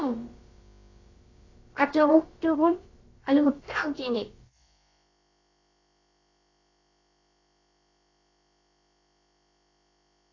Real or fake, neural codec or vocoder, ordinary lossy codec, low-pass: fake; codec, 16 kHz, about 1 kbps, DyCAST, with the encoder's durations; AAC, 32 kbps; 7.2 kHz